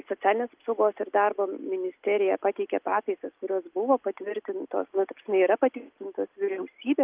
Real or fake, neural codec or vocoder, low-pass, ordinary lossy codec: real; none; 3.6 kHz; Opus, 32 kbps